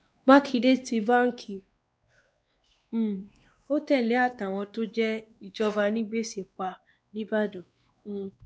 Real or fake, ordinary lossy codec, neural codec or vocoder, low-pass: fake; none; codec, 16 kHz, 2 kbps, X-Codec, WavLM features, trained on Multilingual LibriSpeech; none